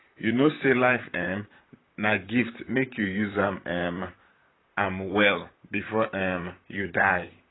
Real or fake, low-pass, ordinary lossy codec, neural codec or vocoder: fake; 7.2 kHz; AAC, 16 kbps; vocoder, 44.1 kHz, 128 mel bands, Pupu-Vocoder